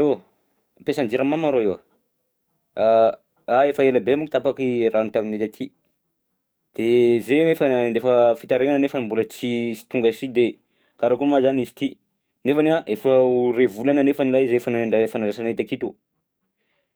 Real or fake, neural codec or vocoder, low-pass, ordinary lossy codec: fake; codec, 44.1 kHz, 7.8 kbps, DAC; none; none